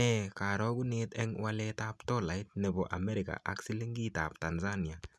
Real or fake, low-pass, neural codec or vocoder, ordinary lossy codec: real; none; none; none